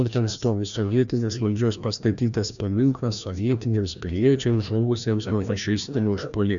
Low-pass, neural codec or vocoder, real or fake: 7.2 kHz; codec, 16 kHz, 1 kbps, FreqCodec, larger model; fake